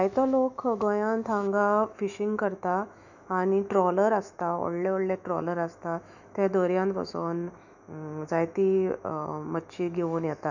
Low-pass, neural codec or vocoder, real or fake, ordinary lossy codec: 7.2 kHz; autoencoder, 48 kHz, 128 numbers a frame, DAC-VAE, trained on Japanese speech; fake; none